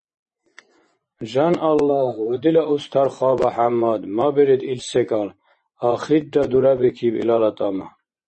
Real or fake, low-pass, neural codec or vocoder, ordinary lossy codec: fake; 10.8 kHz; vocoder, 24 kHz, 100 mel bands, Vocos; MP3, 32 kbps